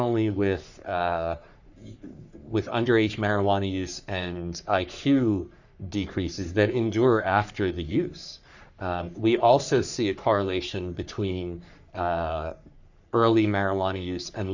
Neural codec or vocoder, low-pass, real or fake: codec, 44.1 kHz, 3.4 kbps, Pupu-Codec; 7.2 kHz; fake